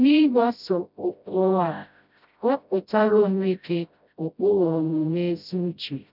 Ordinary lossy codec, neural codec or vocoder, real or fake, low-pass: none; codec, 16 kHz, 0.5 kbps, FreqCodec, smaller model; fake; 5.4 kHz